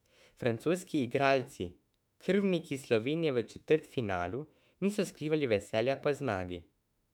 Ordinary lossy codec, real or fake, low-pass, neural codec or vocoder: none; fake; 19.8 kHz; autoencoder, 48 kHz, 32 numbers a frame, DAC-VAE, trained on Japanese speech